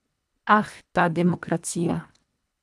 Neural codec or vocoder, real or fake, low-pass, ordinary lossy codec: codec, 24 kHz, 1.5 kbps, HILCodec; fake; none; none